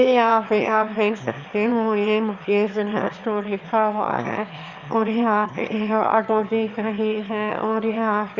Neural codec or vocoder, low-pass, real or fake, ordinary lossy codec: autoencoder, 22.05 kHz, a latent of 192 numbers a frame, VITS, trained on one speaker; 7.2 kHz; fake; Opus, 64 kbps